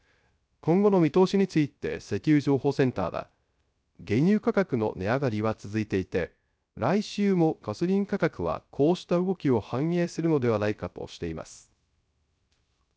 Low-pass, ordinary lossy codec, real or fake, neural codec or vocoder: none; none; fake; codec, 16 kHz, 0.3 kbps, FocalCodec